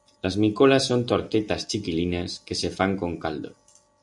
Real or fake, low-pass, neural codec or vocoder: real; 10.8 kHz; none